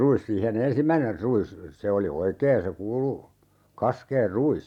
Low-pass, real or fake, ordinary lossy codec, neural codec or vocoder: 19.8 kHz; real; none; none